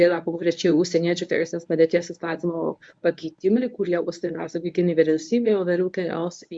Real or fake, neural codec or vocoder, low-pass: fake; codec, 24 kHz, 0.9 kbps, WavTokenizer, medium speech release version 1; 9.9 kHz